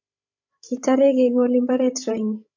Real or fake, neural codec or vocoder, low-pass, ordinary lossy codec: fake; codec, 16 kHz, 16 kbps, FreqCodec, larger model; 7.2 kHz; AAC, 48 kbps